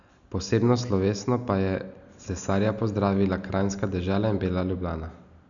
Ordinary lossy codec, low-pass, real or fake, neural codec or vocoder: none; 7.2 kHz; real; none